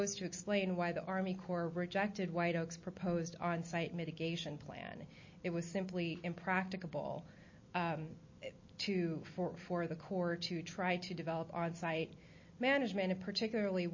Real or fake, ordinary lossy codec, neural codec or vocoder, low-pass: real; MP3, 32 kbps; none; 7.2 kHz